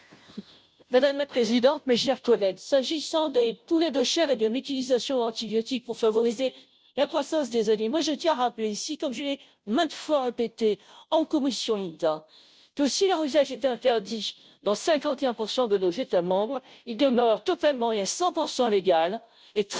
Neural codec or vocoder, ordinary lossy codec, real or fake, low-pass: codec, 16 kHz, 0.5 kbps, FunCodec, trained on Chinese and English, 25 frames a second; none; fake; none